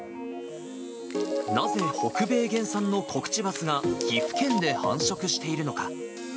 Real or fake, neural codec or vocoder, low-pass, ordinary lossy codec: real; none; none; none